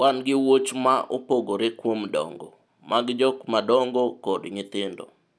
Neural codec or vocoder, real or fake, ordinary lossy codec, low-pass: none; real; none; none